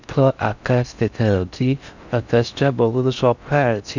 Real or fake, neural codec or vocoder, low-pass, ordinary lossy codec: fake; codec, 16 kHz in and 24 kHz out, 0.6 kbps, FocalCodec, streaming, 4096 codes; 7.2 kHz; none